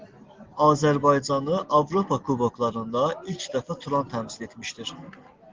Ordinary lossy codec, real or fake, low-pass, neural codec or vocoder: Opus, 16 kbps; real; 7.2 kHz; none